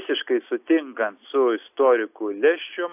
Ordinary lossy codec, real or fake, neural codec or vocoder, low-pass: AAC, 32 kbps; real; none; 3.6 kHz